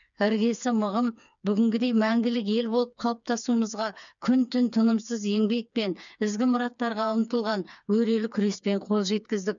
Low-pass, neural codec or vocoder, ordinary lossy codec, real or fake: 7.2 kHz; codec, 16 kHz, 4 kbps, FreqCodec, smaller model; none; fake